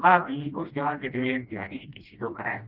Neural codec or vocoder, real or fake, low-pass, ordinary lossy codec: codec, 16 kHz, 1 kbps, FreqCodec, smaller model; fake; 5.4 kHz; Opus, 32 kbps